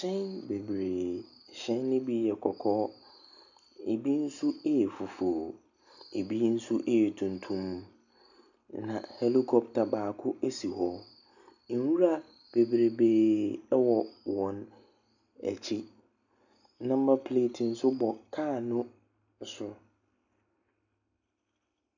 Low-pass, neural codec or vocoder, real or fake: 7.2 kHz; none; real